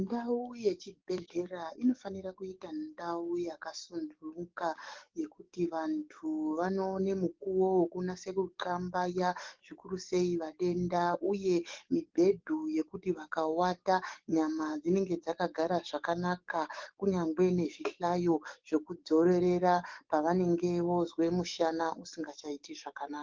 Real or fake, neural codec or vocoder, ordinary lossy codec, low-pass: fake; codec, 44.1 kHz, 7.8 kbps, DAC; Opus, 16 kbps; 7.2 kHz